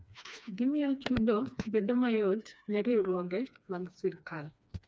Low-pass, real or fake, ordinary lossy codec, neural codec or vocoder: none; fake; none; codec, 16 kHz, 2 kbps, FreqCodec, smaller model